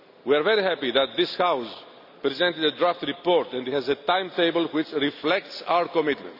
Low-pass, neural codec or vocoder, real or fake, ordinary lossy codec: 5.4 kHz; none; real; none